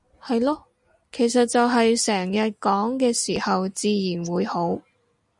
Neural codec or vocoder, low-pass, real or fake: none; 10.8 kHz; real